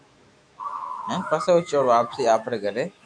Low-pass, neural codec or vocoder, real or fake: 9.9 kHz; autoencoder, 48 kHz, 128 numbers a frame, DAC-VAE, trained on Japanese speech; fake